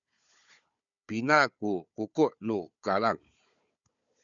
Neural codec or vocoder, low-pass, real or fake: codec, 16 kHz, 4 kbps, FunCodec, trained on Chinese and English, 50 frames a second; 7.2 kHz; fake